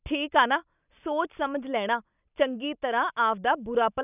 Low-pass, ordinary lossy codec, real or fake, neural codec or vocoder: 3.6 kHz; none; real; none